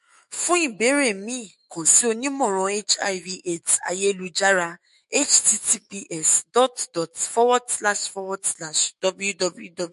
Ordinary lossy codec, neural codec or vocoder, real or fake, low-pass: MP3, 48 kbps; autoencoder, 48 kHz, 128 numbers a frame, DAC-VAE, trained on Japanese speech; fake; 14.4 kHz